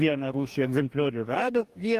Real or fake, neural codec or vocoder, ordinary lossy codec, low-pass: fake; codec, 44.1 kHz, 2.6 kbps, DAC; Opus, 32 kbps; 14.4 kHz